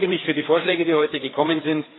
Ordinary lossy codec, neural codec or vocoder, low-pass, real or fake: AAC, 16 kbps; codec, 16 kHz, 4 kbps, FreqCodec, larger model; 7.2 kHz; fake